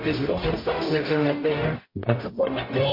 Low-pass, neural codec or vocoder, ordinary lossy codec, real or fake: 5.4 kHz; codec, 44.1 kHz, 0.9 kbps, DAC; MP3, 32 kbps; fake